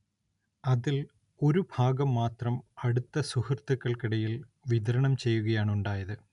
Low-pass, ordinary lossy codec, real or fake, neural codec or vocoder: 10.8 kHz; none; real; none